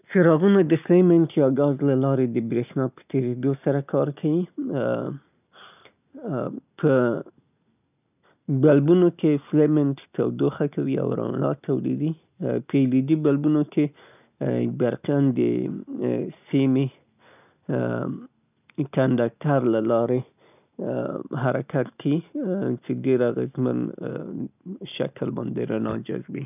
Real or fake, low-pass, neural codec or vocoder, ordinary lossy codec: real; 3.6 kHz; none; none